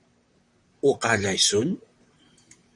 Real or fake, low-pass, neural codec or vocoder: fake; 10.8 kHz; vocoder, 44.1 kHz, 128 mel bands, Pupu-Vocoder